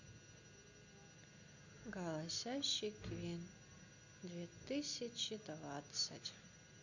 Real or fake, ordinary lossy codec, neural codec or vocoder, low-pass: real; none; none; 7.2 kHz